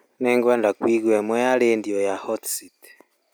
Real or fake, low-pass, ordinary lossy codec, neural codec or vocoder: real; none; none; none